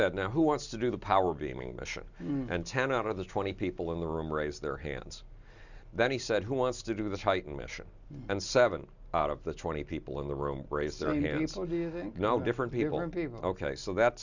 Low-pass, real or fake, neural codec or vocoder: 7.2 kHz; real; none